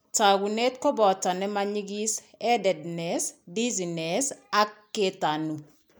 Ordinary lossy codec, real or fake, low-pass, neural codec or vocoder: none; real; none; none